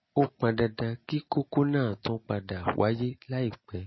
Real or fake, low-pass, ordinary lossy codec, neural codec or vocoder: real; 7.2 kHz; MP3, 24 kbps; none